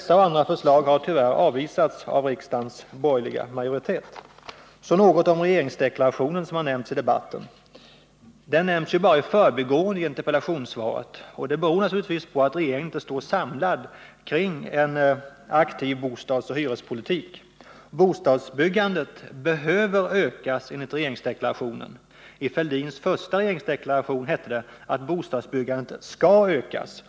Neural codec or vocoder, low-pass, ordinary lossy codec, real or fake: none; none; none; real